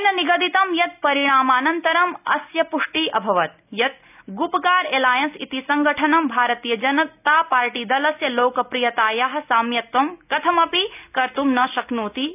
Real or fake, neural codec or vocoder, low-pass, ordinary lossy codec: real; none; 3.6 kHz; none